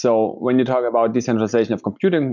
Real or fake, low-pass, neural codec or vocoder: real; 7.2 kHz; none